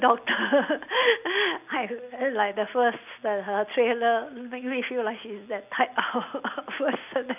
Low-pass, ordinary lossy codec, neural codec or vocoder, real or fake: 3.6 kHz; none; none; real